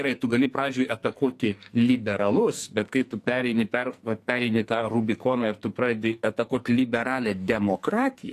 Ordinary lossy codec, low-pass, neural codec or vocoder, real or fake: AAC, 64 kbps; 14.4 kHz; codec, 44.1 kHz, 2.6 kbps, SNAC; fake